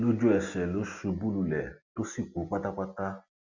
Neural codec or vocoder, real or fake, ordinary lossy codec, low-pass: none; real; none; 7.2 kHz